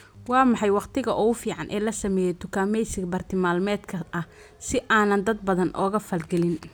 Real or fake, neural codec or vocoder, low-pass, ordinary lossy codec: real; none; none; none